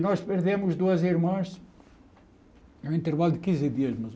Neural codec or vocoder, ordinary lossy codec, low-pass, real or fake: none; none; none; real